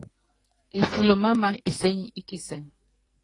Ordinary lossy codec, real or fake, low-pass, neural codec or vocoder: AAC, 32 kbps; fake; 10.8 kHz; codec, 44.1 kHz, 2.6 kbps, SNAC